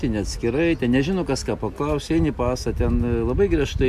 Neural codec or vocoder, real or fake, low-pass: none; real; 14.4 kHz